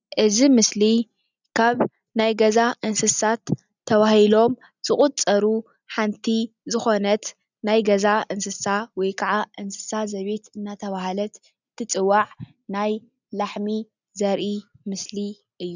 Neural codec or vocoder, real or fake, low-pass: none; real; 7.2 kHz